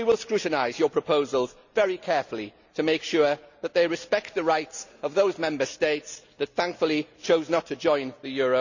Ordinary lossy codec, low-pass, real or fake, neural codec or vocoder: none; 7.2 kHz; real; none